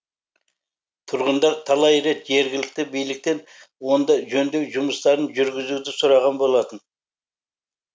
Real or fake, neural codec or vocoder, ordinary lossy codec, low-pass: real; none; none; none